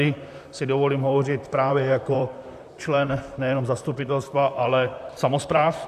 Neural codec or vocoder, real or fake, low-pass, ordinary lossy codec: vocoder, 44.1 kHz, 128 mel bands, Pupu-Vocoder; fake; 14.4 kHz; AAC, 96 kbps